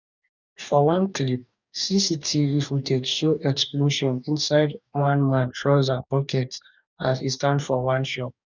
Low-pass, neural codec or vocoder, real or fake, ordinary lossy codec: 7.2 kHz; codec, 44.1 kHz, 2.6 kbps, DAC; fake; none